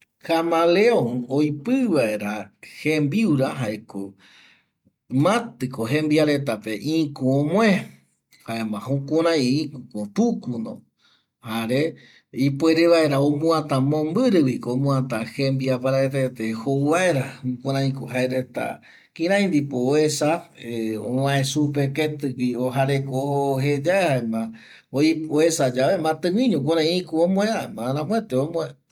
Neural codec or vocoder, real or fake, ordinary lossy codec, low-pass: none; real; MP3, 96 kbps; 19.8 kHz